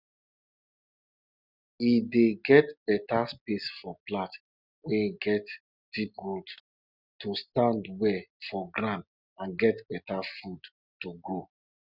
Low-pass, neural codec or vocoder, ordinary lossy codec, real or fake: 5.4 kHz; none; Opus, 64 kbps; real